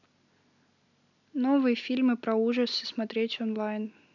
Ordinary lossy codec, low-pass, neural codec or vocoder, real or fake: none; 7.2 kHz; none; real